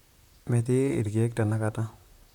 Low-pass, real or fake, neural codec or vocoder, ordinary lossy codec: 19.8 kHz; fake; vocoder, 44.1 kHz, 128 mel bands, Pupu-Vocoder; none